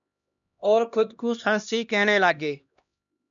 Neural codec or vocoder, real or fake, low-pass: codec, 16 kHz, 1 kbps, X-Codec, HuBERT features, trained on LibriSpeech; fake; 7.2 kHz